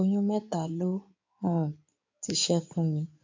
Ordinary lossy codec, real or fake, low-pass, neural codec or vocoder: MP3, 48 kbps; fake; 7.2 kHz; codec, 16 kHz in and 24 kHz out, 2.2 kbps, FireRedTTS-2 codec